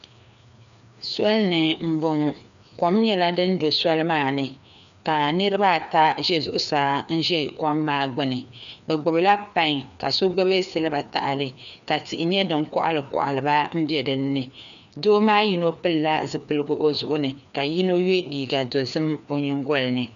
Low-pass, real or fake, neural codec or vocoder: 7.2 kHz; fake; codec, 16 kHz, 2 kbps, FreqCodec, larger model